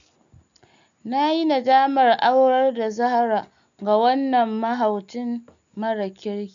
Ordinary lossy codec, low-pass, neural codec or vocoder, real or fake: none; 7.2 kHz; none; real